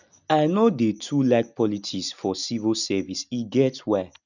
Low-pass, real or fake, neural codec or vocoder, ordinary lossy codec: 7.2 kHz; real; none; none